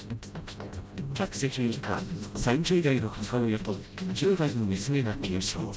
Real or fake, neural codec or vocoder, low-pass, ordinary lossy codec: fake; codec, 16 kHz, 0.5 kbps, FreqCodec, smaller model; none; none